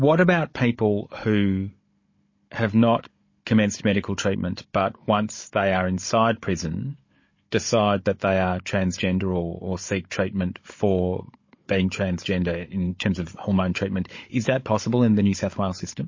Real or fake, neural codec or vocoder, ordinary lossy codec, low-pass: fake; codec, 16 kHz, 16 kbps, FunCodec, trained on Chinese and English, 50 frames a second; MP3, 32 kbps; 7.2 kHz